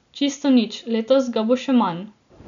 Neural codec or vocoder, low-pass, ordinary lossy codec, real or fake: none; 7.2 kHz; none; real